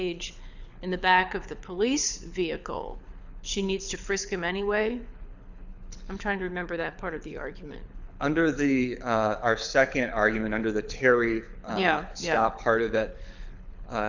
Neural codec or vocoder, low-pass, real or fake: codec, 24 kHz, 6 kbps, HILCodec; 7.2 kHz; fake